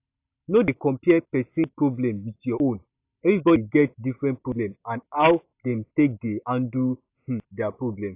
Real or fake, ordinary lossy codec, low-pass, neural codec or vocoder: real; AAC, 32 kbps; 3.6 kHz; none